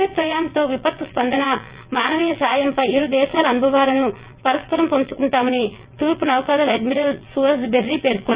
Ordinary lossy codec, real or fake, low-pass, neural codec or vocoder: Opus, 64 kbps; fake; 3.6 kHz; vocoder, 22.05 kHz, 80 mel bands, WaveNeXt